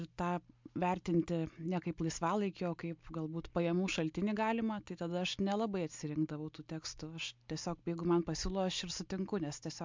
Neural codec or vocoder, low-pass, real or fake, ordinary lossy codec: none; 7.2 kHz; real; MP3, 64 kbps